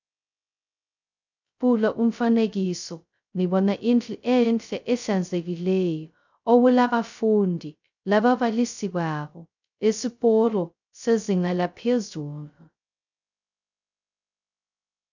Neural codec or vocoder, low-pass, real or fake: codec, 16 kHz, 0.2 kbps, FocalCodec; 7.2 kHz; fake